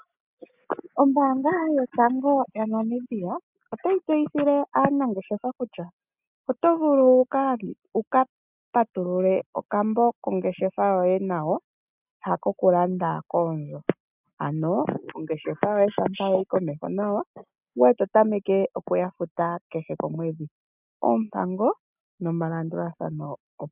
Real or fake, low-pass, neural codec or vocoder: real; 3.6 kHz; none